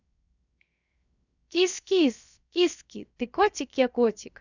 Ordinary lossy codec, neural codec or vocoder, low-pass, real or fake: none; codec, 16 kHz, 0.7 kbps, FocalCodec; 7.2 kHz; fake